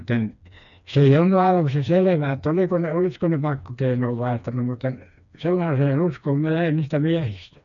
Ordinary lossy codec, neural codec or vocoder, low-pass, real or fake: none; codec, 16 kHz, 2 kbps, FreqCodec, smaller model; 7.2 kHz; fake